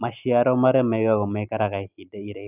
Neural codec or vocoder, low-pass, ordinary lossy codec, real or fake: none; 3.6 kHz; none; real